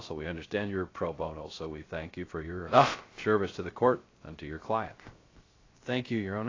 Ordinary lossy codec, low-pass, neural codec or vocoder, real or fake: AAC, 32 kbps; 7.2 kHz; codec, 16 kHz, 0.3 kbps, FocalCodec; fake